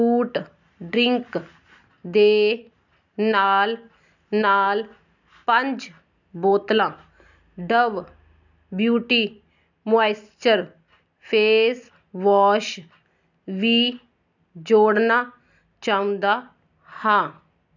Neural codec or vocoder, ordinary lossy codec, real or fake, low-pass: none; none; real; 7.2 kHz